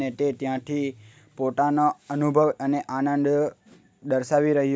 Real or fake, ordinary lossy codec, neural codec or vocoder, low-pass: real; none; none; none